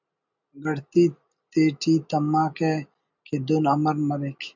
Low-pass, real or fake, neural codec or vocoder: 7.2 kHz; real; none